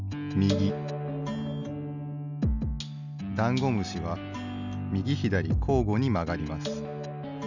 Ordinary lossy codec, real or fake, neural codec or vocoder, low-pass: none; real; none; 7.2 kHz